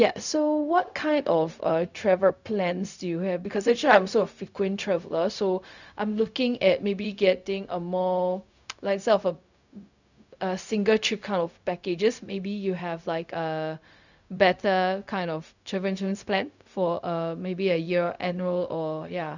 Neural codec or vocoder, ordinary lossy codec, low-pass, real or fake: codec, 16 kHz, 0.4 kbps, LongCat-Audio-Codec; none; 7.2 kHz; fake